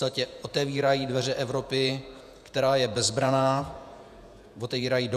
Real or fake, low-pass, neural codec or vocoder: fake; 14.4 kHz; vocoder, 48 kHz, 128 mel bands, Vocos